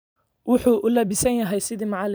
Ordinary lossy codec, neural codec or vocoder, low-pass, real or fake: none; vocoder, 44.1 kHz, 128 mel bands every 256 samples, BigVGAN v2; none; fake